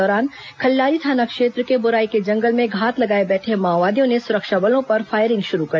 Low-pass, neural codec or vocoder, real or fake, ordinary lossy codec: none; none; real; none